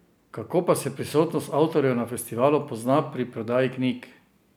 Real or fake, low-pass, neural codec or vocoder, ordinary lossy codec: real; none; none; none